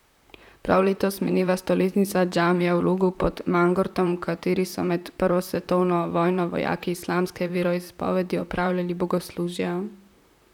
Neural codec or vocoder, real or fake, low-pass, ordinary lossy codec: vocoder, 44.1 kHz, 128 mel bands, Pupu-Vocoder; fake; 19.8 kHz; none